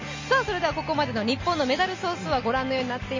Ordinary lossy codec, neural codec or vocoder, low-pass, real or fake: MP3, 32 kbps; none; 7.2 kHz; real